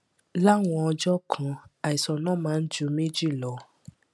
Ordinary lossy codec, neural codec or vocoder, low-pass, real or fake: none; none; none; real